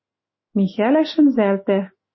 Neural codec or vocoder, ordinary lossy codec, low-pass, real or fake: autoencoder, 48 kHz, 128 numbers a frame, DAC-VAE, trained on Japanese speech; MP3, 24 kbps; 7.2 kHz; fake